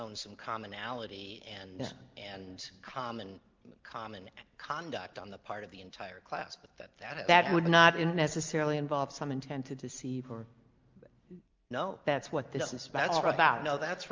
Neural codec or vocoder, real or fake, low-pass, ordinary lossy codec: none; real; 7.2 kHz; Opus, 32 kbps